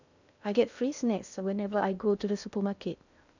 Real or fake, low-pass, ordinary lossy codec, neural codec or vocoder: fake; 7.2 kHz; none; codec, 16 kHz in and 24 kHz out, 0.6 kbps, FocalCodec, streaming, 2048 codes